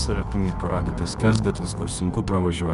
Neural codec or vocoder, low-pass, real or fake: codec, 24 kHz, 0.9 kbps, WavTokenizer, medium music audio release; 10.8 kHz; fake